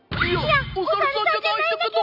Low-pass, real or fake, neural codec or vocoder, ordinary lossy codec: 5.4 kHz; real; none; none